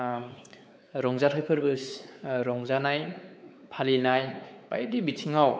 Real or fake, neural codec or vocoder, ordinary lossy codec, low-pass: fake; codec, 16 kHz, 4 kbps, X-Codec, WavLM features, trained on Multilingual LibriSpeech; none; none